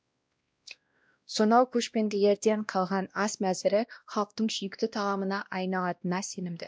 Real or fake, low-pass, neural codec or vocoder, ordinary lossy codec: fake; none; codec, 16 kHz, 1 kbps, X-Codec, WavLM features, trained on Multilingual LibriSpeech; none